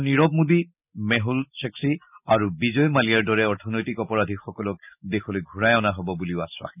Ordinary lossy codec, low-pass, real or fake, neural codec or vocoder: none; 3.6 kHz; real; none